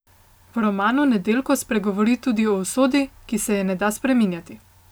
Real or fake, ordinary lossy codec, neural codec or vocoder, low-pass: real; none; none; none